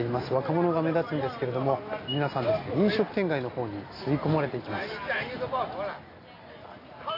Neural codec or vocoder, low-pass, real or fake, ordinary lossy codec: none; 5.4 kHz; real; AAC, 24 kbps